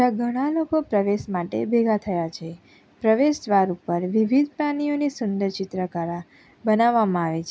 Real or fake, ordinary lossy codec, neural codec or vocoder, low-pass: real; none; none; none